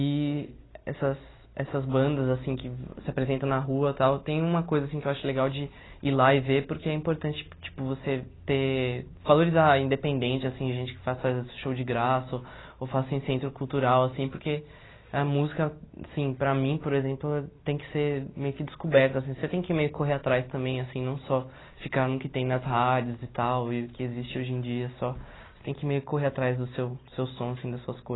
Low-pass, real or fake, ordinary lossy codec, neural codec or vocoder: 7.2 kHz; real; AAC, 16 kbps; none